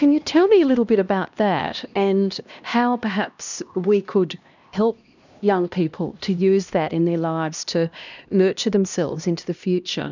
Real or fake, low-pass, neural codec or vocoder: fake; 7.2 kHz; codec, 16 kHz, 1 kbps, X-Codec, HuBERT features, trained on LibriSpeech